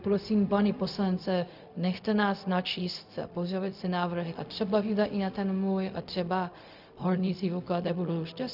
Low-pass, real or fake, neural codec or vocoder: 5.4 kHz; fake; codec, 16 kHz, 0.4 kbps, LongCat-Audio-Codec